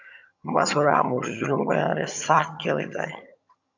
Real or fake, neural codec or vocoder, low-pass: fake; vocoder, 22.05 kHz, 80 mel bands, HiFi-GAN; 7.2 kHz